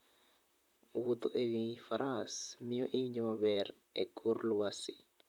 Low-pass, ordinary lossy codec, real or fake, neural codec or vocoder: 19.8 kHz; none; fake; vocoder, 44.1 kHz, 128 mel bands, Pupu-Vocoder